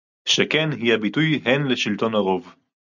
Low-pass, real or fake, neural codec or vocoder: 7.2 kHz; real; none